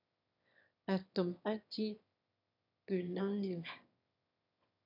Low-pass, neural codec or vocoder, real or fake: 5.4 kHz; autoencoder, 22.05 kHz, a latent of 192 numbers a frame, VITS, trained on one speaker; fake